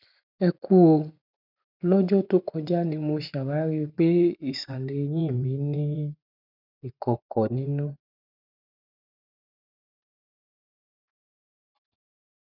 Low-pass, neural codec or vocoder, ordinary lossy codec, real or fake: 5.4 kHz; vocoder, 24 kHz, 100 mel bands, Vocos; none; fake